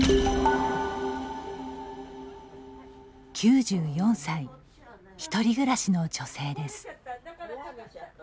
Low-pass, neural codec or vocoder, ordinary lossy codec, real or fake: none; none; none; real